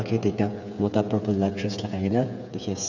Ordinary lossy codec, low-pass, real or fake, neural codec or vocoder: none; 7.2 kHz; fake; codec, 16 kHz, 8 kbps, FreqCodec, smaller model